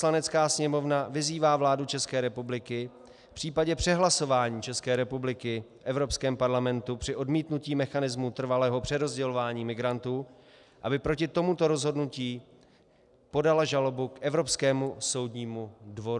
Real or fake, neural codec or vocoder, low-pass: real; none; 10.8 kHz